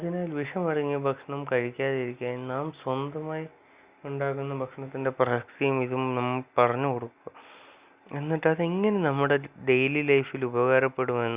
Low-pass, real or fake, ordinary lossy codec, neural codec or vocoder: 3.6 kHz; real; Opus, 64 kbps; none